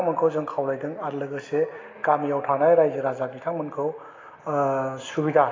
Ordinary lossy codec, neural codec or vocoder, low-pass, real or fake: AAC, 32 kbps; none; 7.2 kHz; real